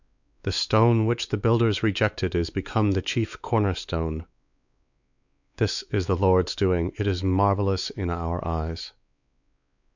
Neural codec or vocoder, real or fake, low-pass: codec, 16 kHz, 4 kbps, X-Codec, WavLM features, trained on Multilingual LibriSpeech; fake; 7.2 kHz